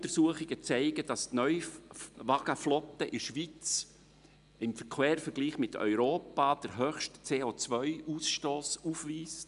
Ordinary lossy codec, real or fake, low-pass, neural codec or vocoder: none; real; 10.8 kHz; none